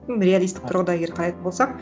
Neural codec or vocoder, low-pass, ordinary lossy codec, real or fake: none; none; none; real